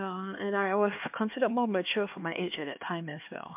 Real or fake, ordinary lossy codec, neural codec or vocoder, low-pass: fake; MP3, 32 kbps; codec, 16 kHz, 2 kbps, X-Codec, HuBERT features, trained on LibriSpeech; 3.6 kHz